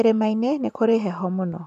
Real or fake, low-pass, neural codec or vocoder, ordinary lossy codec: fake; 14.4 kHz; vocoder, 44.1 kHz, 128 mel bands every 512 samples, BigVGAN v2; none